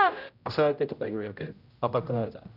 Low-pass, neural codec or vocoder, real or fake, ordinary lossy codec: 5.4 kHz; codec, 16 kHz, 0.5 kbps, X-Codec, HuBERT features, trained on general audio; fake; none